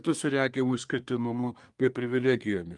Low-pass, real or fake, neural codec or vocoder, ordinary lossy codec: 10.8 kHz; fake; codec, 24 kHz, 1 kbps, SNAC; Opus, 32 kbps